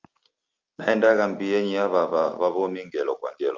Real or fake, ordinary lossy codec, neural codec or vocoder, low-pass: real; Opus, 32 kbps; none; 7.2 kHz